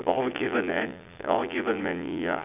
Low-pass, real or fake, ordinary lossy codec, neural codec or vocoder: 3.6 kHz; fake; none; vocoder, 22.05 kHz, 80 mel bands, Vocos